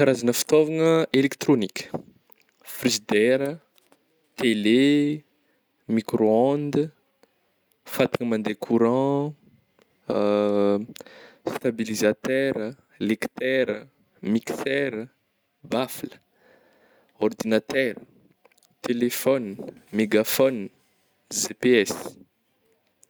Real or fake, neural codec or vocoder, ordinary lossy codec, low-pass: real; none; none; none